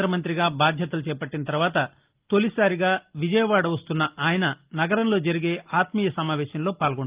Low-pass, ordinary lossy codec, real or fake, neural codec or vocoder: 3.6 kHz; Opus, 32 kbps; real; none